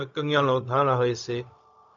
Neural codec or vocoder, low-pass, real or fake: codec, 16 kHz, 0.4 kbps, LongCat-Audio-Codec; 7.2 kHz; fake